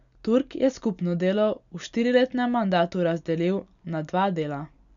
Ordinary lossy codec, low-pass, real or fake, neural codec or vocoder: none; 7.2 kHz; real; none